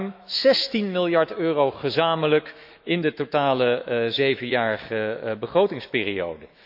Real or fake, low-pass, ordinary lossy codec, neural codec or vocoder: fake; 5.4 kHz; none; autoencoder, 48 kHz, 128 numbers a frame, DAC-VAE, trained on Japanese speech